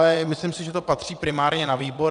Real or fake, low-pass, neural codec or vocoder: fake; 9.9 kHz; vocoder, 22.05 kHz, 80 mel bands, WaveNeXt